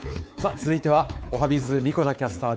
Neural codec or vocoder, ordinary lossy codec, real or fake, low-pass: codec, 16 kHz, 4 kbps, X-Codec, WavLM features, trained on Multilingual LibriSpeech; none; fake; none